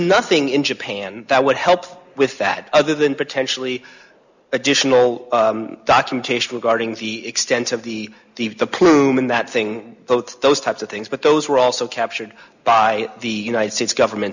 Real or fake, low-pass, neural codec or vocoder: real; 7.2 kHz; none